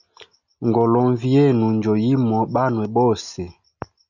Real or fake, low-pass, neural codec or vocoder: real; 7.2 kHz; none